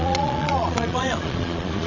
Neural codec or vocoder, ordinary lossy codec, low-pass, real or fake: vocoder, 22.05 kHz, 80 mel bands, Vocos; none; 7.2 kHz; fake